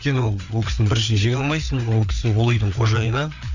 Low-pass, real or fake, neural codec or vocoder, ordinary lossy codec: 7.2 kHz; fake; codec, 16 kHz, 4 kbps, FreqCodec, larger model; none